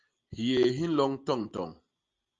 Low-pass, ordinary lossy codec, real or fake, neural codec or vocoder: 7.2 kHz; Opus, 32 kbps; real; none